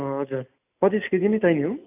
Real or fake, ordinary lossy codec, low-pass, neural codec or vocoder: real; AAC, 32 kbps; 3.6 kHz; none